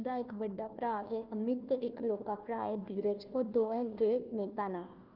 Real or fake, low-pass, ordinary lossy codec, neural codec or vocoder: fake; 5.4 kHz; Opus, 32 kbps; codec, 16 kHz, 1 kbps, FunCodec, trained on Chinese and English, 50 frames a second